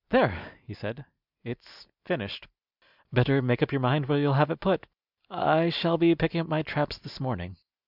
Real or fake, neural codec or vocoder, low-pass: real; none; 5.4 kHz